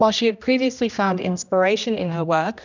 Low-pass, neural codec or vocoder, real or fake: 7.2 kHz; codec, 16 kHz, 1 kbps, X-Codec, HuBERT features, trained on general audio; fake